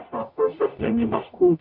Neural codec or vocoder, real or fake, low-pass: codec, 44.1 kHz, 0.9 kbps, DAC; fake; 5.4 kHz